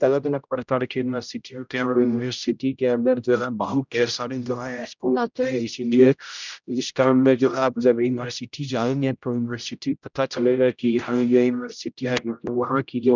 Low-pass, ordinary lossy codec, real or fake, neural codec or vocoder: 7.2 kHz; none; fake; codec, 16 kHz, 0.5 kbps, X-Codec, HuBERT features, trained on general audio